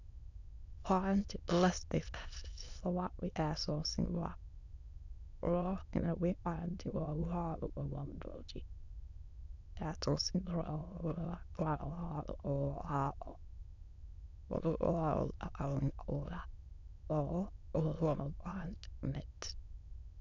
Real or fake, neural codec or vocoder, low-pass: fake; autoencoder, 22.05 kHz, a latent of 192 numbers a frame, VITS, trained on many speakers; 7.2 kHz